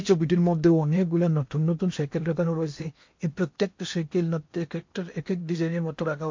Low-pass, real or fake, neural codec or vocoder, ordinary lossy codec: 7.2 kHz; fake; codec, 16 kHz in and 24 kHz out, 0.8 kbps, FocalCodec, streaming, 65536 codes; MP3, 48 kbps